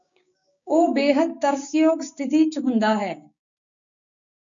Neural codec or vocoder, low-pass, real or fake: codec, 16 kHz, 6 kbps, DAC; 7.2 kHz; fake